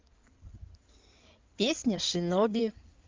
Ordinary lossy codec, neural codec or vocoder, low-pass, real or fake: Opus, 24 kbps; codec, 16 kHz in and 24 kHz out, 2.2 kbps, FireRedTTS-2 codec; 7.2 kHz; fake